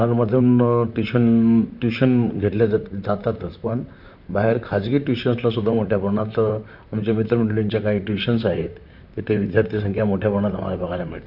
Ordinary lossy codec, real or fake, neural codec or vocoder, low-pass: none; fake; vocoder, 44.1 kHz, 128 mel bands, Pupu-Vocoder; 5.4 kHz